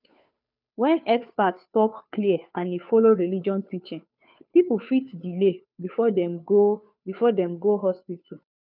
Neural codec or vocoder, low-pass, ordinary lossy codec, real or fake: codec, 16 kHz, 2 kbps, FunCodec, trained on Chinese and English, 25 frames a second; 5.4 kHz; none; fake